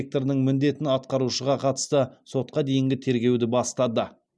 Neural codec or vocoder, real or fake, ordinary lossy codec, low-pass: none; real; none; none